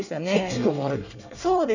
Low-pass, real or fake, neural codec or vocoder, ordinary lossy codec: 7.2 kHz; fake; codec, 24 kHz, 1 kbps, SNAC; none